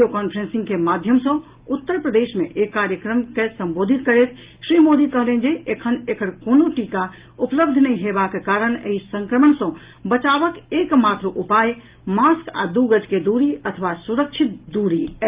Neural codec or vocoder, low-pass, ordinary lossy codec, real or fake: none; 3.6 kHz; Opus, 32 kbps; real